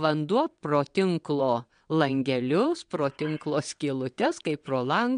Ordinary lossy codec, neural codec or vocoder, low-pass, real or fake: MP3, 64 kbps; vocoder, 22.05 kHz, 80 mel bands, WaveNeXt; 9.9 kHz; fake